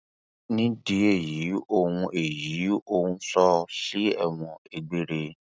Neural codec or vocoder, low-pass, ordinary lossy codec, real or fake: none; none; none; real